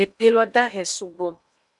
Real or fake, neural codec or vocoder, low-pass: fake; codec, 16 kHz in and 24 kHz out, 0.8 kbps, FocalCodec, streaming, 65536 codes; 10.8 kHz